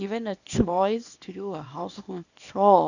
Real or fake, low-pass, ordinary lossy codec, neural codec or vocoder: fake; 7.2 kHz; none; codec, 24 kHz, 0.9 kbps, WavTokenizer, small release